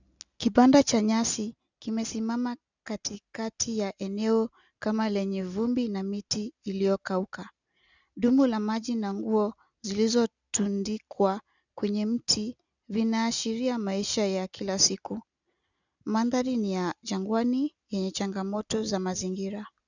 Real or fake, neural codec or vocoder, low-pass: real; none; 7.2 kHz